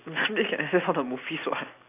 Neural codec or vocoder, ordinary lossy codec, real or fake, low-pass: none; AAC, 24 kbps; real; 3.6 kHz